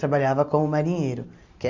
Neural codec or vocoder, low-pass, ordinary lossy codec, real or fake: none; 7.2 kHz; MP3, 64 kbps; real